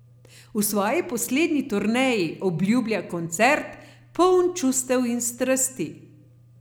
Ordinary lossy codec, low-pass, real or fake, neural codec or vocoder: none; none; real; none